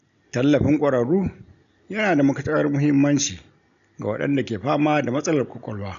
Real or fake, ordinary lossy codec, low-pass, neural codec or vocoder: real; none; 7.2 kHz; none